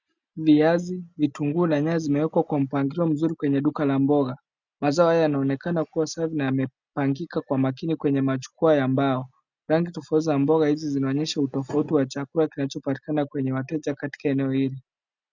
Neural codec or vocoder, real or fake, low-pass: none; real; 7.2 kHz